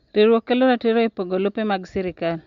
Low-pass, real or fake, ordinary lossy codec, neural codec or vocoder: 7.2 kHz; real; Opus, 64 kbps; none